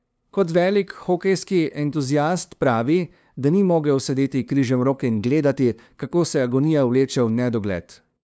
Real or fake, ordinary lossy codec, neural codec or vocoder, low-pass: fake; none; codec, 16 kHz, 2 kbps, FunCodec, trained on LibriTTS, 25 frames a second; none